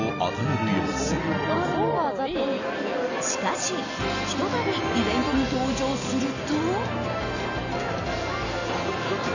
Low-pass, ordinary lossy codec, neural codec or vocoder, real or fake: 7.2 kHz; none; none; real